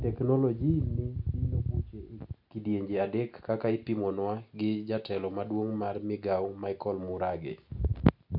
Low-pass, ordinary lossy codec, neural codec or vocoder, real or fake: 5.4 kHz; none; none; real